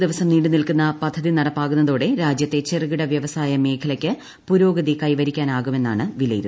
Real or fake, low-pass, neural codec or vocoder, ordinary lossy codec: real; none; none; none